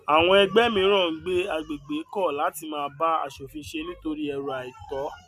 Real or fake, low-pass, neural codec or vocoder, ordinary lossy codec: real; 14.4 kHz; none; none